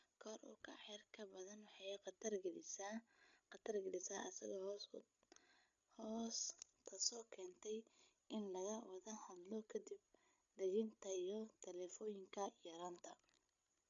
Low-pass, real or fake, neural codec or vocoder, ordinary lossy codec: 7.2 kHz; fake; codec, 16 kHz, 16 kbps, FreqCodec, larger model; none